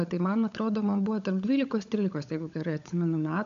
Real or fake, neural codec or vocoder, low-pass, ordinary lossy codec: fake; codec, 16 kHz, 16 kbps, FunCodec, trained on LibriTTS, 50 frames a second; 7.2 kHz; AAC, 64 kbps